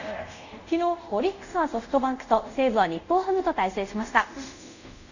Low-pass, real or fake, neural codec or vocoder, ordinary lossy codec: 7.2 kHz; fake; codec, 24 kHz, 0.5 kbps, DualCodec; none